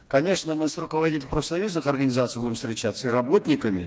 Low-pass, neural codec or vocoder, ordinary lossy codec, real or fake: none; codec, 16 kHz, 2 kbps, FreqCodec, smaller model; none; fake